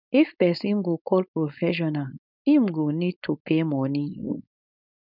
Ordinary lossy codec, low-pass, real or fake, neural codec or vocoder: none; 5.4 kHz; fake; codec, 16 kHz, 4.8 kbps, FACodec